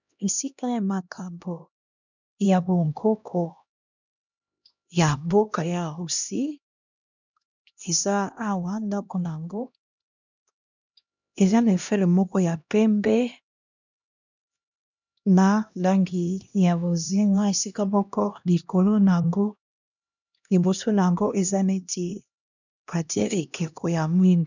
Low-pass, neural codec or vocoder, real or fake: 7.2 kHz; codec, 16 kHz, 1 kbps, X-Codec, HuBERT features, trained on LibriSpeech; fake